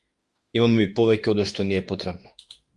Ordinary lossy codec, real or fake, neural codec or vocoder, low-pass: Opus, 32 kbps; fake; autoencoder, 48 kHz, 32 numbers a frame, DAC-VAE, trained on Japanese speech; 10.8 kHz